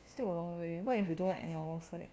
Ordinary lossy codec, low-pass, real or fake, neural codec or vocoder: none; none; fake; codec, 16 kHz, 1 kbps, FunCodec, trained on LibriTTS, 50 frames a second